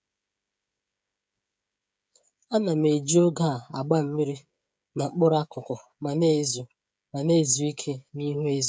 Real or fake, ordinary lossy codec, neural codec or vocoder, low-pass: fake; none; codec, 16 kHz, 16 kbps, FreqCodec, smaller model; none